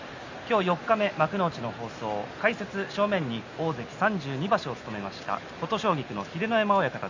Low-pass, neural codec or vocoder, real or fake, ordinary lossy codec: 7.2 kHz; none; real; MP3, 48 kbps